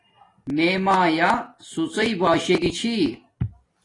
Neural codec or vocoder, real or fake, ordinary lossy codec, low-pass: none; real; AAC, 32 kbps; 10.8 kHz